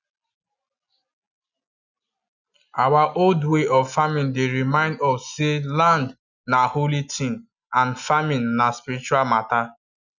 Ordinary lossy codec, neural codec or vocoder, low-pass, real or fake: none; none; 7.2 kHz; real